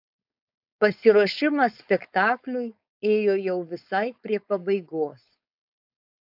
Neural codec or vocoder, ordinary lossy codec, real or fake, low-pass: codec, 16 kHz, 4.8 kbps, FACodec; AAC, 48 kbps; fake; 5.4 kHz